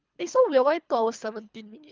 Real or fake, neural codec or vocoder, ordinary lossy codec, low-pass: fake; codec, 24 kHz, 3 kbps, HILCodec; Opus, 24 kbps; 7.2 kHz